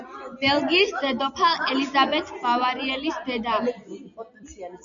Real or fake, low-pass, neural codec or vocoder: real; 7.2 kHz; none